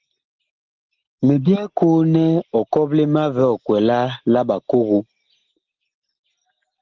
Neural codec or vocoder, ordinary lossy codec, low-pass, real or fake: none; Opus, 16 kbps; 7.2 kHz; real